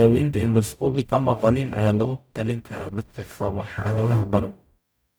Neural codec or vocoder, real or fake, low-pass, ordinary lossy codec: codec, 44.1 kHz, 0.9 kbps, DAC; fake; none; none